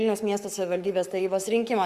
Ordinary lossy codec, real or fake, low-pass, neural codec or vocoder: Opus, 64 kbps; fake; 14.4 kHz; codec, 44.1 kHz, 7.8 kbps, Pupu-Codec